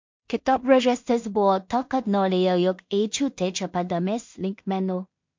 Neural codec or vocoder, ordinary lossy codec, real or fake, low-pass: codec, 16 kHz in and 24 kHz out, 0.4 kbps, LongCat-Audio-Codec, two codebook decoder; MP3, 48 kbps; fake; 7.2 kHz